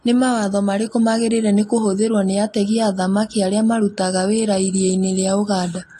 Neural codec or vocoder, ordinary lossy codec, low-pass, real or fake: none; AAC, 48 kbps; 14.4 kHz; real